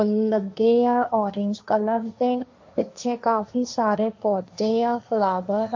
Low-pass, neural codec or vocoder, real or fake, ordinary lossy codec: 7.2 kHz; codec, 16 kHz, 1.1 kbps, Voila-Tokenizer; fake; MP3, 48 kbps